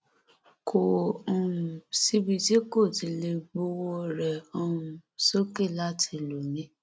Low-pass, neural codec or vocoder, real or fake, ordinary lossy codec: none; none; real; none